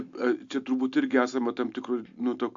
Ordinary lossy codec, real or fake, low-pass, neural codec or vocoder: AAC, 64 kbps; real; 7.2 kHz; none